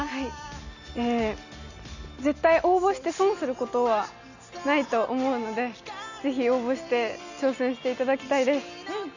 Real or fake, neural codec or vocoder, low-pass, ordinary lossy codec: real; none; 7.2 kHz; none